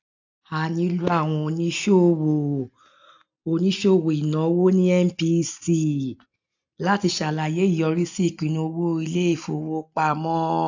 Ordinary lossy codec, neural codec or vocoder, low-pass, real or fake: AAC, 48 kbps; none; 7.2 kHz; real